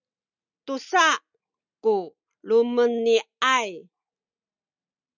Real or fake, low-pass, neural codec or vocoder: real; 7.2 kHz; none